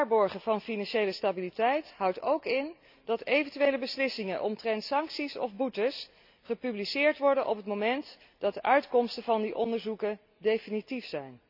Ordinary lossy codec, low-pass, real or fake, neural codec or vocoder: none; 5.4 kHz; real; none